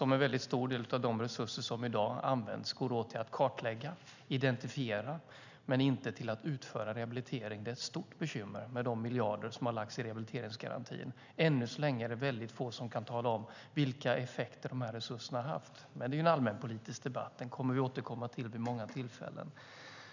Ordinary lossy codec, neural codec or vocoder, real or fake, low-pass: none; none; real; 7.2 kHz